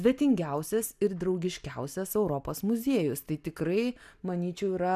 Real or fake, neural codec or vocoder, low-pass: real; none; 14.4 kHz